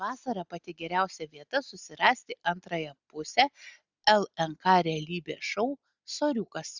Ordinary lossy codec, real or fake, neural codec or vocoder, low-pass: Opus, 64 kbps; real; none; 7.2 kHz